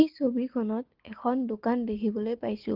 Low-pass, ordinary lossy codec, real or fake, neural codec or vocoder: 5.4 kHz; Opus, 16 kbps; real; none